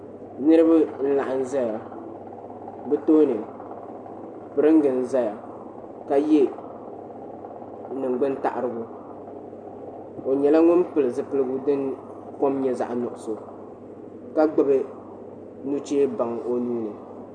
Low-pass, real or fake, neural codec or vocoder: 9.9 kHz; real; none